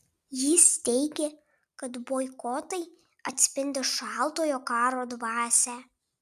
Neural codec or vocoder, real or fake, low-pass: none; real; 14.4 kHz